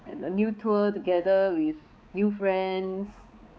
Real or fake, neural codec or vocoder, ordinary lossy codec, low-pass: fake; codec, 16 kHz, 4 kbps, X-Codec, HuBERT features, trained on balanced general audio; none; none